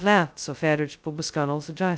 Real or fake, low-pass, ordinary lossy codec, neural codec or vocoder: fake; none; none; codec, 16 kHz, 0.2 kbps, FocalCodec